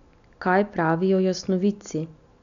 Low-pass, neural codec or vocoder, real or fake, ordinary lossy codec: 7.2 kHz; none; real; none